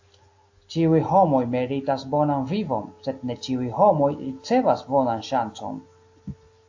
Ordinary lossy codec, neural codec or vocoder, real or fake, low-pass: AAC, 48 kbps; none; real; 7.2 kHz